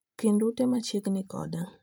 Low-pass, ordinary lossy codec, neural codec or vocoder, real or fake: none; none; vocoder, 44.1 kHz, 128 mel bands every 512 samples, BigVGAN v2; fake